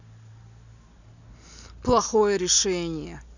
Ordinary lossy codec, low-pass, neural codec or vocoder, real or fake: none; 7.2 kHz; none; real